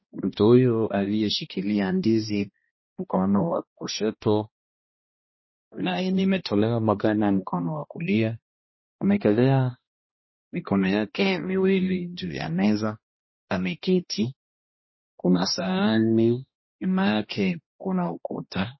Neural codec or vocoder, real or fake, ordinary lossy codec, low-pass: codec, 16 kHz, 1 kbps, X-Codec, HuBERT features, trained on balanced general audio; fake; MP3, 24 kbps; 7.2 kHz